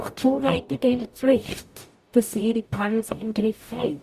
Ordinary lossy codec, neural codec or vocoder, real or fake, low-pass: Opus, 64 kbps; codec, 44.1 kHz, 0.9 kbps, DAC; fake; 14.4 kHz